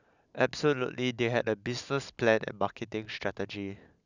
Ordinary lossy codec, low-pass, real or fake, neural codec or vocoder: none; 7.2 kHz; real; none